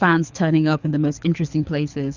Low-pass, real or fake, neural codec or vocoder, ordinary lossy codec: 7.2 kHz; fake; codec, 24 kHz, 6 kbps, HILCodec; Opus, 64 kbps